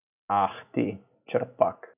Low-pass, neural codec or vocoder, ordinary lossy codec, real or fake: 3.6 kHz; none; none; real